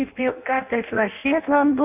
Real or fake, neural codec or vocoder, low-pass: fake; codec, 16 kHz in and 24 kHz out, 0.6 kbps, FireRedTTS-2 codec; 3.6 kHz